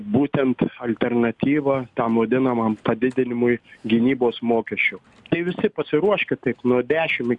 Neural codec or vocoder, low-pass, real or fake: none; 10.8 kHz; real